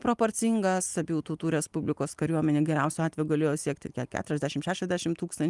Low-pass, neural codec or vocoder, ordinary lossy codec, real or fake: 10.8 kHz; none; Opus, 32 kbps; real